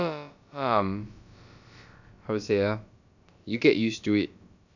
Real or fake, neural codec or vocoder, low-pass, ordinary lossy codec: fake; codec, 16 kHz, about 1 kbps, DyCAST, with the encoder's durations; 7.2 kHz; none